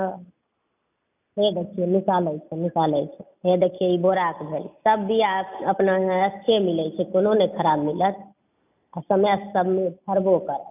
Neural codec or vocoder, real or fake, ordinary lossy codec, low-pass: none; real; none; 3.6 kHz